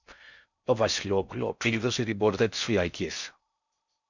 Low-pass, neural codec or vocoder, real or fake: 7.2 kHz; codec, 16 kHz in and 24 kHz out, 0.6 kbps, FocalCodec, streaming, 4096 codes; fake